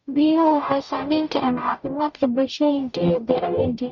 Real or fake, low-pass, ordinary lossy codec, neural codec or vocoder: fake; 7.2 kHz; none; codec, 44.1 kHz, 0.9 kbps, DAC